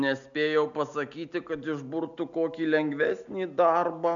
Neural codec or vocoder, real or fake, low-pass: none; real; 7.2 kHz